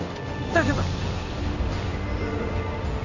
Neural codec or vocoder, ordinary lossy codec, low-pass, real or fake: codec, 16 kHz in and 24 kHz out, 1 kbps, XY-Tokenizer; none; 7.2 kHz; fake